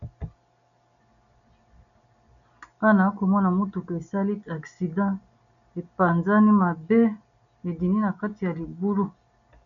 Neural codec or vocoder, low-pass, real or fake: none; 7.2 kHz; real